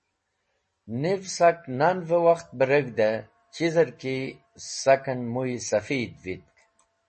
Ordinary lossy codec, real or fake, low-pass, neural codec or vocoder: MP3, 32 kbps; real; 10.8 kHz; none